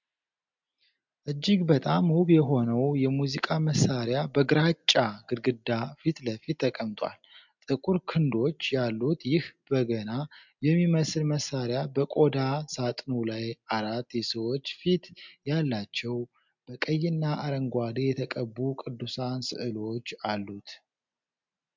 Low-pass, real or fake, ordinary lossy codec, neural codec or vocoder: 7.2 kHz; real; MP3, 64 kbps; none